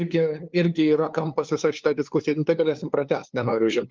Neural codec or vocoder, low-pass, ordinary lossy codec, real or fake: codec, 16 kHz, 2 kbps, FunCodec, trained on LibriTTS, 25 frames a second; 7.2 kHz; Opus, 32 kbps; fake